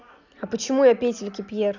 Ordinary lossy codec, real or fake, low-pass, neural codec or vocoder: none; real; 7.2 kHz; none